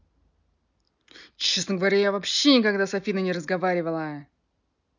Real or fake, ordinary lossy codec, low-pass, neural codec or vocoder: real; none; 7.2 kHz; none